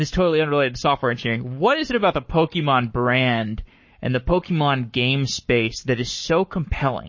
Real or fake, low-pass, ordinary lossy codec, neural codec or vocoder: fake; 7.2 kHz; MP3, 32 kbps; codec, 44.1 kHz, 7.8 kbps, Pupu-Codec